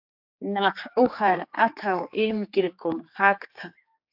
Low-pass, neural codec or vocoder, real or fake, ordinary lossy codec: 5.4 kHz; codec, 16 kHz, 4 kbps, X-Codec, HuBERT features, trained on general audio; fake; AAC, 48 kbps